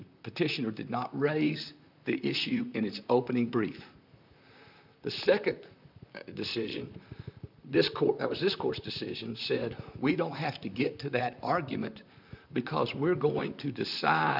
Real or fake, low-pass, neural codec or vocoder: fake; 5.4 kHz; vocoder, 44.1 kHz, 128 mel bands, Pupu-Vocoder